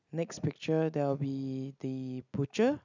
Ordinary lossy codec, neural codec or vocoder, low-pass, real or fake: none; none; 7.2 kHz; real